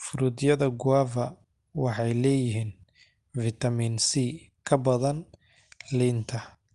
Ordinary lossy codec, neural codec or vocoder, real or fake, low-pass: Opus, 32 kbps; none; real; 10.8 kHz